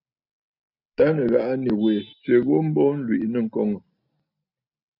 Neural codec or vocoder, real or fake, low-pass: none; real; 5.4 kHz